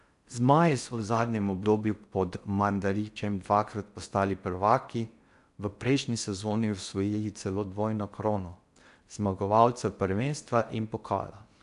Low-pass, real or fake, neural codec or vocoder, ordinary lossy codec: 10.8 kHz; fake; codec, 16 kHz in and 24 kHz out, 0.6 kbps, FocalCodec, streaming, 4096 codes; none